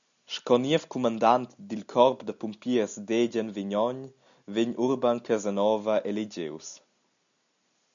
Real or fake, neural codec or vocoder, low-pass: real; none; 7.2 kHz